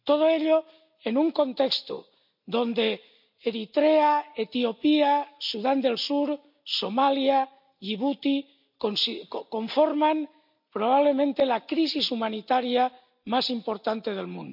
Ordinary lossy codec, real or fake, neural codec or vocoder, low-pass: MP3, 48 kbps; real; none; 5.4 kHz